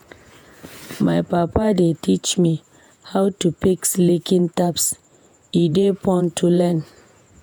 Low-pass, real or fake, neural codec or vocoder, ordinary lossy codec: none; fake; vocoder, 48 kHz, 128 mel bands, Vocos; none